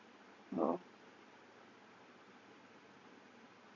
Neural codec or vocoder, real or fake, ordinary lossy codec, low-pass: none; real; none; 7.2 kHz